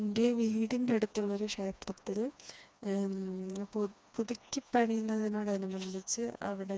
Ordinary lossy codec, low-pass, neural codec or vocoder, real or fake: none; none; codec, 16 kHz, 2 kbps, FreqCodec, smaller model; fake